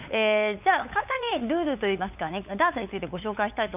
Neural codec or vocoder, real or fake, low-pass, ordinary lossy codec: codec, 16 kHz, 8 kbps, FunCodec, trained on LibriTTS, 25 frames a second; fake; 3.6 kHz; none